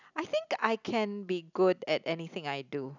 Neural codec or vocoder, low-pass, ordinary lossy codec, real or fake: none; 7.2 kHz; none; real